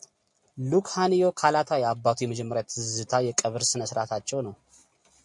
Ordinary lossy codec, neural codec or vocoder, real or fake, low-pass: MP3, 64 kbps; none; real; 10.8 kHz